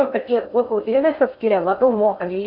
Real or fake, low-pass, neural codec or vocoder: fake; 5.4 kHz; codec, 16 kHz in and 24 kHz out, 0.6 kbps, FocalCodec, streaming, 4096 codes